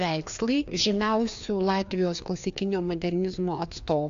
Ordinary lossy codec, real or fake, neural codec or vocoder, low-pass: AAC, 64 kbps; fake; codec, 16 kHz, 2 kbps, FreqCodec, larger model; 7.2 kHz